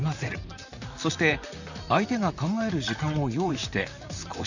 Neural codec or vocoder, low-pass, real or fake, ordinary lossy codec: vocoder, 44.1 kHz, 128 mel bands, Pupu-Vocoder; 7.2 kHz; fake; none